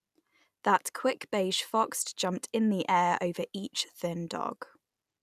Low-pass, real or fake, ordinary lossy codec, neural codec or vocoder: 14.4 kHz; real; none; none